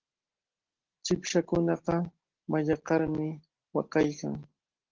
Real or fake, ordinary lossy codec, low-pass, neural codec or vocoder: real; Opus, 16 kbps; 7.2 kHz; none